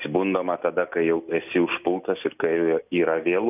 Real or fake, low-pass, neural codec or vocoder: real; 3.6 kHz; none